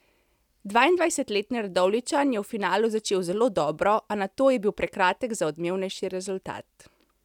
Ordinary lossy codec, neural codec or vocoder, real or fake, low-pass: none; none; real; 19.8 kHz